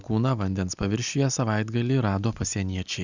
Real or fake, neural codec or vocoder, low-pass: real; none; 7.2 kHz